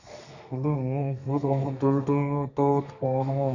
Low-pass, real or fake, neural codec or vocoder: 7.2 kHz; fake; codec, 32 kHz, 1.9 kbps, SNAC